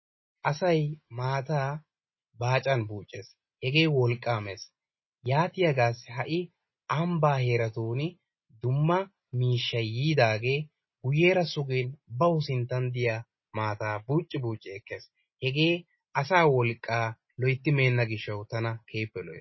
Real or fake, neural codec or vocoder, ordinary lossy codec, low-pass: real; none; MP3, 24 kbps; 7.2 kHz